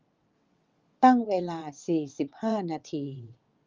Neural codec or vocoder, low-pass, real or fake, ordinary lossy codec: vocoder, 22.05 kHz, 80 mel bands, WaveNeXt; 7.2 kHz; fake; Opus, 32 kbps